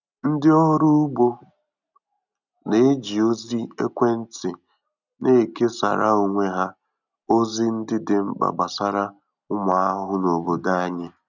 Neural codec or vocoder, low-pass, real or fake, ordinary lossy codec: none; 7.2 kHz; real; none